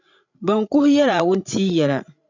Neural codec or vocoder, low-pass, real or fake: codec, 16 kHz, 16 kbps, FreqCodec, larger model; 7.2 kHz; fake